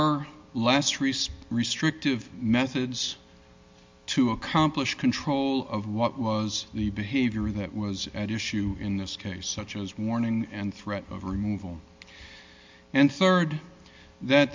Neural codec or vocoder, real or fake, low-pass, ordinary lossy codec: none; real; 7.2 kHz; MP3, 64 kbps